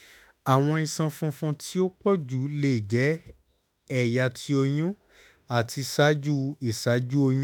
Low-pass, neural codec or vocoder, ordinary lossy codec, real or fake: none; autoencoder, 48 kHz, 32 numbers a frame, DAC-VAE, trained on Japanese speech; none; fake